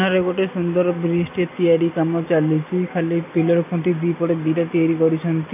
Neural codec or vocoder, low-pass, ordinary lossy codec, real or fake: none; 3.6 kHz; none; real